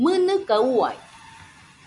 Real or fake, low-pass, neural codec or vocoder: real; 10.8 kHz; none